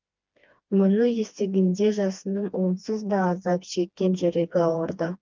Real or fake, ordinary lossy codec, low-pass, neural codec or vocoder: fake; Opus, 24 kbps; 7.2 kHz; codec, 16 kHz, 2 kbps, FreqCodec, smaller model